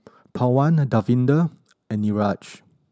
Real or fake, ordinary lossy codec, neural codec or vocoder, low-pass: real; none; none; none